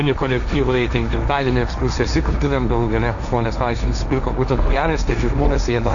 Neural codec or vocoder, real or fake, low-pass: codec, 16 kHz, 1.1 kbps, Voila-Tokenizer; fake; 7.2 kHz